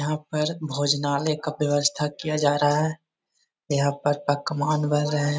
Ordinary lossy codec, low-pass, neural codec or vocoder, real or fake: none; none; none; real